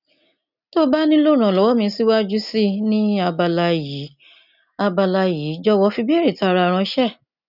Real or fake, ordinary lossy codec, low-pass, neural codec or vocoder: real; none; 5.4 kHz; none